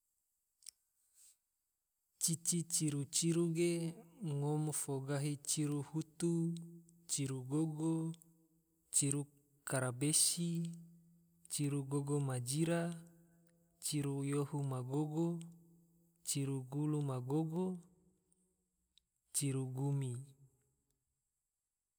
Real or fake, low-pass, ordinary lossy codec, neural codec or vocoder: real; none; none; none